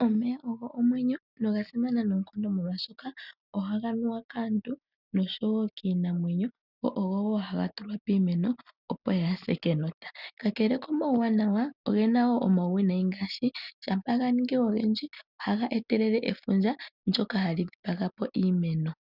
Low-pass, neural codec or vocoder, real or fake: 5.4 kHz; none; real